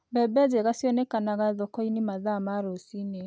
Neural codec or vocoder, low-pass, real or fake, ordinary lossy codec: none; none; real; none